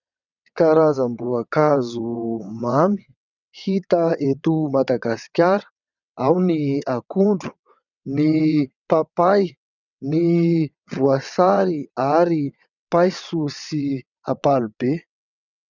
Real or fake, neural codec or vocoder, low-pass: fake; vocoder, 22.05 kHz, 80 mel bands, WaveNeXt; 7.2 kHz